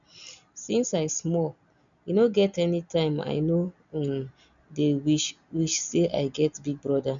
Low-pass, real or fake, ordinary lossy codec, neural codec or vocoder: 7.2 kHz; real; none; none